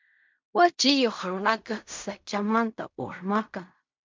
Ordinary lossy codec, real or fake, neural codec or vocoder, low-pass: MP3, 64 kbps; fake; codec, 16 kHz in and 24 kHz out, 0.4 kbps, LongCat-Audio-Codec, fine tuned four codebook decoder; 7.2 kHz